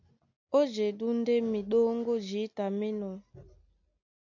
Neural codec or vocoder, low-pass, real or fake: none; 7.2 kHz; real